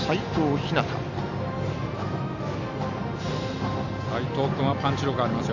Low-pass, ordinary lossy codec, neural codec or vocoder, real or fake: 7.2 kHz; none; none; real